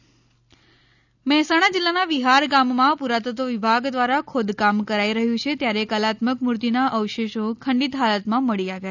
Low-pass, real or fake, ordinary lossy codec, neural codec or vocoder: 7.2 kHz; real; none; none